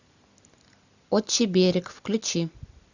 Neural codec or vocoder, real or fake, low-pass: none; real; 7.2 kHz